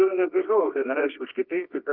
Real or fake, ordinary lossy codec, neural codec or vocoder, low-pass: fake; Opus, 24 kbps; codec, 24 kHz, 0.9 kbps, WavTokenizer, medium music audio release; 5.4 kHz